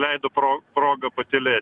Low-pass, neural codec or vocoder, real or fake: 10.8 kHz; none; real